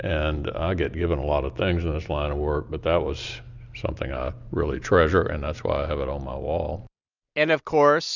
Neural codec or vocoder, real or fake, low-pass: none; real; 7.2 kHz